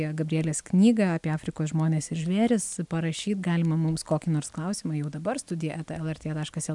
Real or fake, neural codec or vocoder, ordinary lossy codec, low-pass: real; none; MP3, 96 kbps; 10.8 kHz